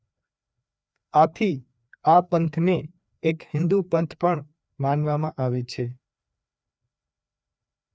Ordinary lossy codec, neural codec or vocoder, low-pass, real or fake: none; codec, 16 kHz, 2 kbps, FreqCodec, larger model; none; fake